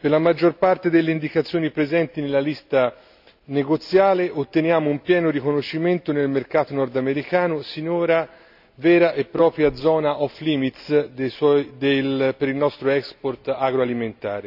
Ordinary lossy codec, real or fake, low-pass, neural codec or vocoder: none; real; 5.4 kHz; none